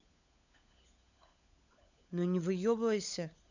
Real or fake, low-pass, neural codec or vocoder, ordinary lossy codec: fake; 7.2 kHz; codec, 16 kHz, 16 kbps, FunCodec, trained on LibriTTS, 50 frames a second; none